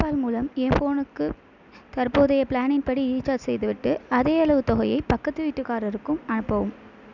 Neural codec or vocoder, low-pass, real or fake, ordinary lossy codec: none; 7.2 kHz; real; none